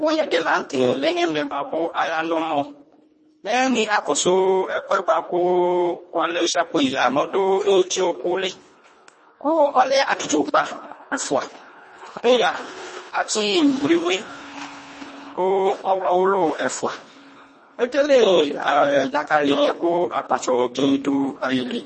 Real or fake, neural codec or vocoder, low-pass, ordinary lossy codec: fake; codec, 24 kHz, 1.5 kbps, HILCodec; 10.8 kHz; MP3, 32 kbps